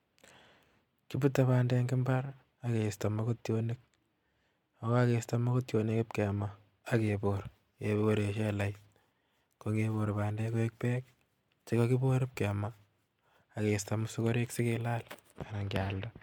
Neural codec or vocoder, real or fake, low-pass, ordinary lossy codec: none; real; 19.8 kHz; MP3, 96 kbps